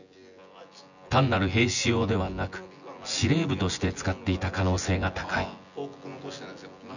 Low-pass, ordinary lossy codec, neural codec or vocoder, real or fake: 7.2 kHz; none; vocoder, 24 kHz, 100 mel bands, Vocos; fake